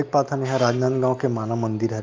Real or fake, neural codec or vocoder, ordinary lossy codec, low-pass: real; none; Opus, 32 kbps; 7.2 kHz